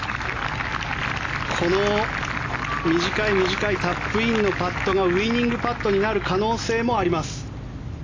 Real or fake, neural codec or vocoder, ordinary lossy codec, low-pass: real; none; AAC, 32 kbps; 7.2 kHz